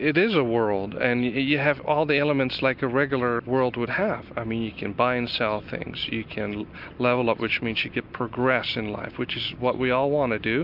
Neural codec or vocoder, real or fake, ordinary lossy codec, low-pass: none; real; MP3, 48 kbps; 5.4 kHz